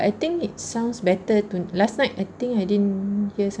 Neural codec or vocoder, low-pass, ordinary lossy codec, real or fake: none; 9.9 kHz; none; real